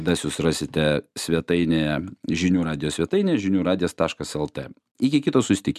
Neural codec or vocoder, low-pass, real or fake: none; 14.4 kHz; real